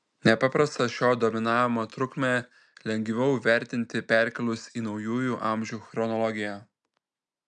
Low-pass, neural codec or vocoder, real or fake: 9.9 kHz; none; real